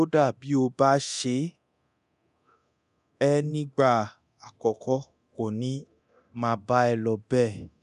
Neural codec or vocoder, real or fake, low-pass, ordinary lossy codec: codec, 24 kHz, 0.9 kbps, DualCodec; fake; 10.8 kHz; none